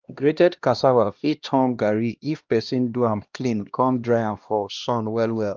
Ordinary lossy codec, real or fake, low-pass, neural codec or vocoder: Opus, 24 kbps; fake; 7.2 kHz; codec, 16 kHz, 1 kbps, X-Codec, HuBERT features, trained on LibriSpeech